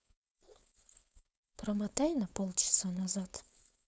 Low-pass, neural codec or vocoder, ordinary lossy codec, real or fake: none; codec, 16 kHz, 4.8 kbps, FACodec; none; fake